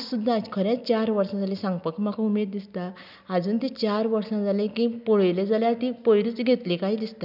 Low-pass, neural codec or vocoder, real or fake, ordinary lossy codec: 5.4 kHz; none; real; none